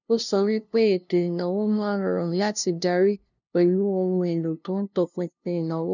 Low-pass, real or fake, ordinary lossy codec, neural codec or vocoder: 7.2 kHz; fake; none; codec, 16 kHz, 0.5 kbps, FunCodec, trained on LibriTTS, 25 frames a second